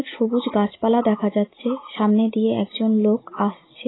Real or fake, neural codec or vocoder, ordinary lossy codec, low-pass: real; none; AAC, 16 kbps; 7.2 kHz